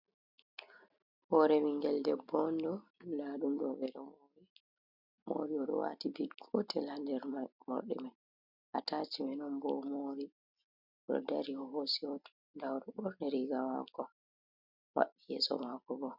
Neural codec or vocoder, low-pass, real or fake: none; 5.4 kHz; real